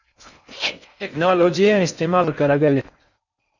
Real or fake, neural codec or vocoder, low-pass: fake; codec, 16 kHz in and 24 kHz out, 0.6 kbps, FocalCodec, streaming, 4096 codes; 7.2 kHz